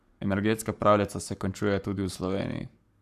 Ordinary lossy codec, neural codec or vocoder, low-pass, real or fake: none; codec, 44.1 kHz, 7.8 kbps, Pupu-Codec; 14.4 kHz; fake